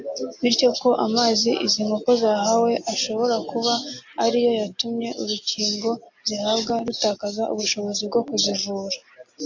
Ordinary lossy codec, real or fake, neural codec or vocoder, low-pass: AAC, 48 kbps; real; none; 7.2 kHz